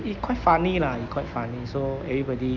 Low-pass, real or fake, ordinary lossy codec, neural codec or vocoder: 7.2 kHz; real; none; none